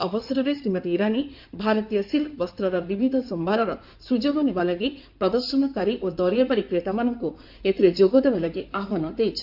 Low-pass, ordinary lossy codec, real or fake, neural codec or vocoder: 5.4 kHz; MP3, 48 kbps; fake; codec, 16 kHz in and 24 kHz out, 2.2 kbps, FireRedTTS-2 codec